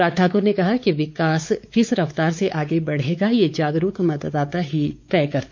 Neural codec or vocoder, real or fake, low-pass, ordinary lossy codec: codec, 16 kHz, 4 kbps, X-Codec, WavLM features, trained on Multilingual LibriSpeech; fake; 7.2 kHz; MP3, 48 kbps